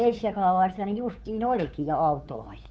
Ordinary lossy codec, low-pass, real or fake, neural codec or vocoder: none; none; fake; codec, 16 kHz, 2 kbps, FunCodec, trained on Chinese and English, 25 frames a second